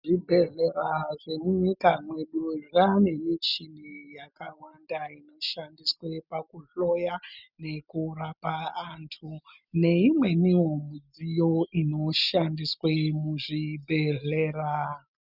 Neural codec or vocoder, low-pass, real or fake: none; 5.4 kHz; real